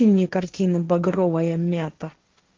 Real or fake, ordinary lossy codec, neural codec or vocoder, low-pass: fake; Opus, 32 kbps; codec, 16 kHz, 1.1 kbps, Voila-Tokenizer; 7.2 kHz